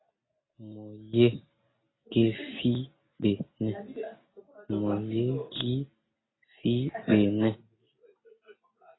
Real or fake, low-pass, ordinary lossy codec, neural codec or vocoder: real; 7.2 kHz; AAC, 16 kbps; none